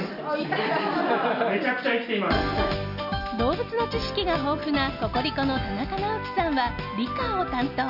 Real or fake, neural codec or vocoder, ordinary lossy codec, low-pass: real; none; none; 5.4 kHz